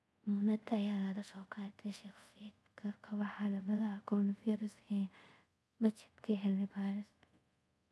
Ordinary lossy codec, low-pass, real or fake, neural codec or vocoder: none; none; fake; codec, 24 kHz, 0.5 kbps, DualCodec